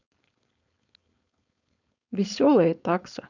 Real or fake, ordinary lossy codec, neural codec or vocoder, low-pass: fake; none; codec, 16 kHz, 4.8 kbps, FACodec; 7.2 kHz